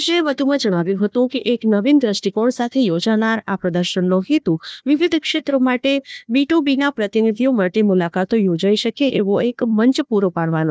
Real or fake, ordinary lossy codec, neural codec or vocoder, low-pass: fake; none; codec, 16 kHz, 1 kbps, FunCodec, trained on Chinese and English, 50 frames a second; none